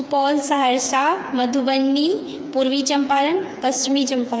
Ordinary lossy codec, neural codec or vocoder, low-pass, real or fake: none; codec, 16 kHz, 4 kbps, FreqCodec, smaller model; none; fake